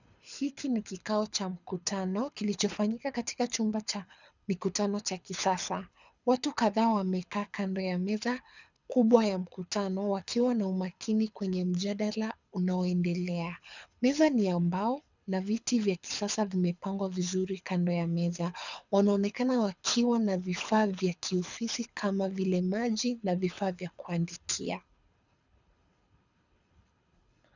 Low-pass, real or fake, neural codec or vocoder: 7.2 kHz; fake; codec, 24 kHz, 6 kbps, HILCodec